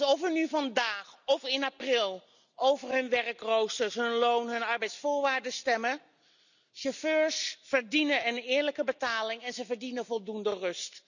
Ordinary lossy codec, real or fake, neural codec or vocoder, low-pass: none; real; none; 7.2 kHz